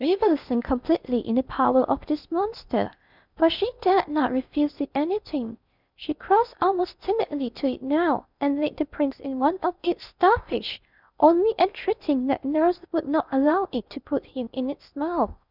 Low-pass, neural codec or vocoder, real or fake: 5.4 kHz; codec, 16 kHz in and 24 kHz out, 0.6 kbps, FocalCodec, streaming, 4096 codes; fake